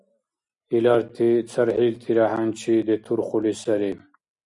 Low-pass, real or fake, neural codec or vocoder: 10.8 kHz; real; none